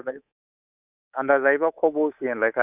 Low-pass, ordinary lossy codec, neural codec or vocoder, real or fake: 3.6 kHz; none; codec, 24 kHz, 3.1 kbps, DualCodec; fake